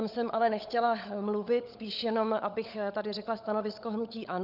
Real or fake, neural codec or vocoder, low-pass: fake; codec, 16 kHz, 16 kbps, FunCodec, trained on LibriTTS, 50 frames a second; 5.4 kHz